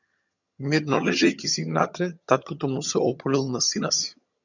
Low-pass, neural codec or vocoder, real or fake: 7.2 kHz; vocoder, 22.05 kHz, 80 mel bands, HiFi-GAN; fake